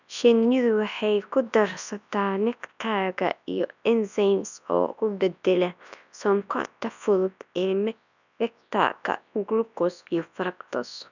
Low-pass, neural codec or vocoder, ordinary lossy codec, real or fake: 7.2 kHz; codec, 24 kHz, 0.9 kbps, WavTokenizer, large speech release; none; fake